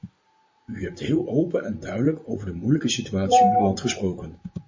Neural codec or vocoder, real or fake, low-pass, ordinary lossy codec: vocoder, 44.1 kHz, 128 mel bands, Pupu-Vocoder; fake; 7.2 kHz; MP3, 32 kbps